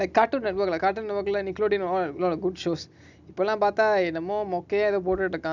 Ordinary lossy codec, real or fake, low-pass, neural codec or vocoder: none; real; 7.2 kHz; none